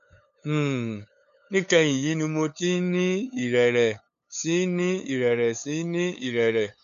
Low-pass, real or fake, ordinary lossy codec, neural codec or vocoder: 7.2 kHz; fake; none; codec, 16 kHz, 8 kbps, FunCodec, trained on LibriTTS, 25 frames a second